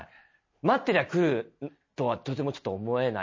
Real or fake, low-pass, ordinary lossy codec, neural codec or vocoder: real; 7.2 kHz; MP3, 32 kbps; none